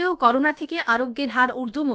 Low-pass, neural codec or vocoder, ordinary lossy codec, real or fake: none; codec, 16 kHz, about 1 kbps, DyCAST, with the encoder's durations; none; fake